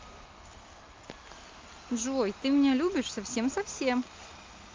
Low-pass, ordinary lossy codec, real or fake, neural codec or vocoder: 7.2 kHz; Opus, 24 kbps; real; none